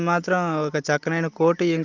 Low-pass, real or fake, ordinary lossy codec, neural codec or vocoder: 7.2 kHz; real; Opus, 24 kbps; none